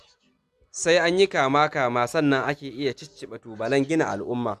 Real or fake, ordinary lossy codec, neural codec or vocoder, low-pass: real; none; none; 14.4 kHz